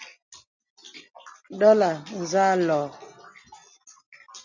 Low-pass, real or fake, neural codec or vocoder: 7.2 kHz; real; none